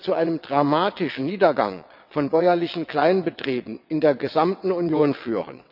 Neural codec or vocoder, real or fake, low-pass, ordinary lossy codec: vocoder, 22.05 kHz, 80 mel bands, WaveNeXt; fake; 5.4 kHz; none